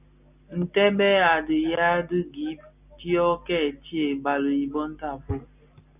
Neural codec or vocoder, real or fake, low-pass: none; real; 3.6 kHz